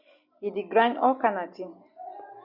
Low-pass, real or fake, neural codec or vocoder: 5.4 kHz; real; none